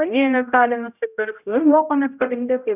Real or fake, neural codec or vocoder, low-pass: fake; codec, 16 kHz, 0.5 kbps, X-Codec, HuBERT features, trained on general audio; 3.6 kHz